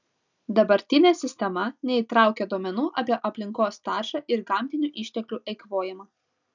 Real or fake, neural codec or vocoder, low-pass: real; none; 7.2 kHz